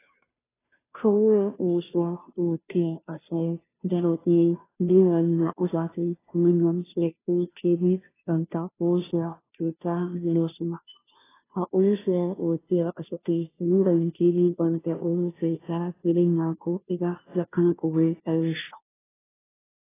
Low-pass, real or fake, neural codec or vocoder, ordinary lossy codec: 3.6 kHz; fake; codec, 16 kHz, 0.5 kbps, FunCodec, trained on Chinese and English, 25 frames a second; AAC, 16 kbps